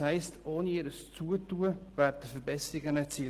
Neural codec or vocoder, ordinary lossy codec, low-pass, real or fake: none; Opus, 16 kbps; 14.4 kHz; real